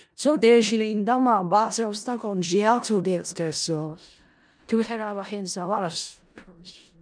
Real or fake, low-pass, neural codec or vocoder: fake; 9.9 kHz; codec, 16 kHz in and 24 kHz out, 0.4 kbps, LongCat-Audio-Codec, four codebook decoder